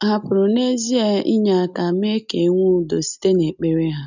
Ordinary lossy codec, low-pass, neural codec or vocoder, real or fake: none; 7.2 kHz; none; real